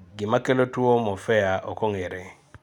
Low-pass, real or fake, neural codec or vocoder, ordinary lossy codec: 19.8 kHz; real; none; none